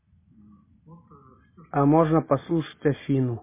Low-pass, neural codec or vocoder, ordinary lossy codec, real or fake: 3.6 kHz; none; MP3, 16 kbps; real